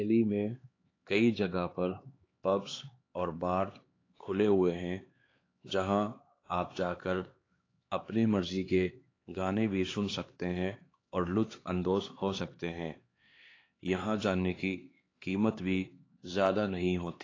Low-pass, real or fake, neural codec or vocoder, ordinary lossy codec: 7.2 kHz; fake; codec, 16 kHz, 4 kbps, X-Codec, HuBERT features, trained on LibriSpeech; AAC, 32 kbps